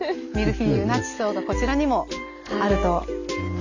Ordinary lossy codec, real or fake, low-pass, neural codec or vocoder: none; real; 7.2 kHz; none